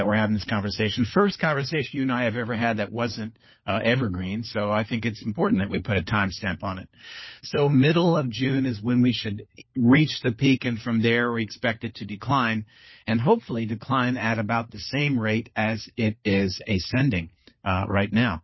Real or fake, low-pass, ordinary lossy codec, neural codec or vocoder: fake; 7.2 kHz; MP3, 24 kbps; codec, 16 kHz, 4 kbps, FunCodec, trained on LibriTTS, 50 frames a second